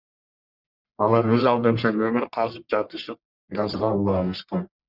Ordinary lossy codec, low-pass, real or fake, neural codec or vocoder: Opus, 64 kbps; 5.4 kHz; fake; codec, 44.1 kHz, 1.7 kbps, Pupu-Codec